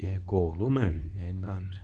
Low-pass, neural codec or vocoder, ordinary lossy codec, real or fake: 10.8 kHz; codec, 24 kHz, 0.9 kbps, WavTokenizer, medium speech release version 2; none; fake